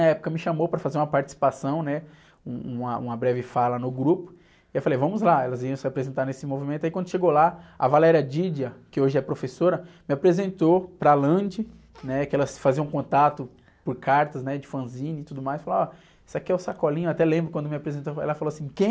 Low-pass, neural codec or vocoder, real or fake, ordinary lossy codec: none; none; real; none